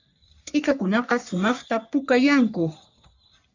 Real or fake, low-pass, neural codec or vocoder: fake; 7.2 kHz; codec, 44.1 kHz, 3.4 kbps, Pupu-Codec